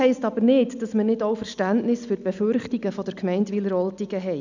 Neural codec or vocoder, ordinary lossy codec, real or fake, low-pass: none; none; real; 7.2 kHz